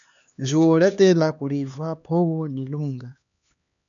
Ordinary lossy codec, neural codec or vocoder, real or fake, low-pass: MP3, 96 kbps; codec, 16 kHz, 2 kbps, X-Codec, HuBERT features, trained on LibriSpeech; fake; 7.2 kHz